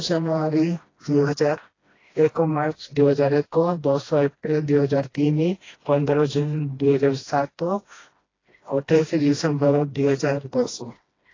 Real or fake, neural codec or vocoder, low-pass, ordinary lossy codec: fake; codec, 16 kHz, 1 kbps, FreqCodec, smaller model; 7.2 kHz; AAC, 32 kbps